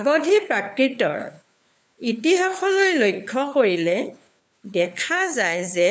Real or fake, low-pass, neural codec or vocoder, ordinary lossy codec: fake; none; codec, 16 kHz, 4 kbps, FunCodec, trained on LibriTTS, 50 frames a second; none